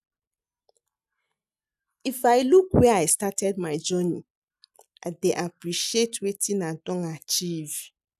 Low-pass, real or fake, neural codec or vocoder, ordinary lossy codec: 14.4 kHz; real; none; none